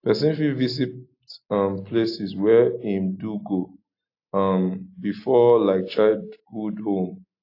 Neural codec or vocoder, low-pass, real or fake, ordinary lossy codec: none; 5.4 kHz; real; AAC, 32 kbps